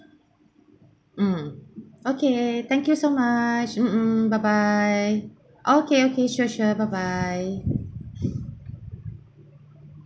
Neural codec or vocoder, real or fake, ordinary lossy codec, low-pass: none; real; none; none